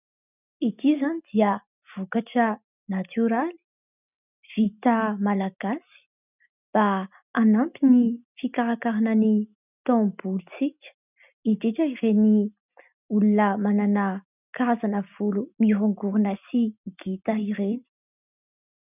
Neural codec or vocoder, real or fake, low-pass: none; real; 3.6 kHz